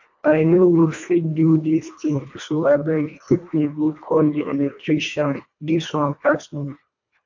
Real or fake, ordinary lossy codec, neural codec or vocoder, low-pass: fake; MP3, 48 kbps; codec, 24 kHz, 1.5 kbps, HILCodec; 7.2 kHz